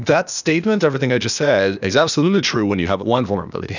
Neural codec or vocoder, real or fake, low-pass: codec, 16 kHz, 0.8 kbps, ZipCodec; fake; 7.2 kHz